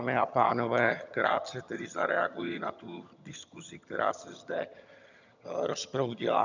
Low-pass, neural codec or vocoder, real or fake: 7.2 kHz; vocoder, 22.05 kHz, 80 mel bands, HiFi-GAN; fake